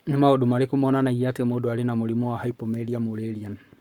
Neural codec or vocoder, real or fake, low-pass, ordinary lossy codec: codec, 44.1 kHz, 7.8 kbps, Pupu-Codec; fake; 19.8 kHz; Opus, 64 kbps